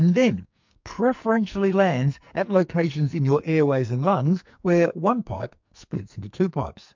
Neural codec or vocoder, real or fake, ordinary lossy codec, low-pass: codec, 44.1 kHz, 2.6 kbps, SNAC; fake; MP3, 64 kbps; 7.2 kHz